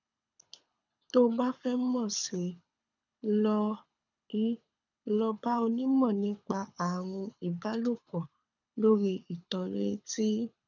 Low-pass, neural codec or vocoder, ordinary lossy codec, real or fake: 7.2 kHz; codec, 24 kHz, 6 kbps, HILCodec; none; fake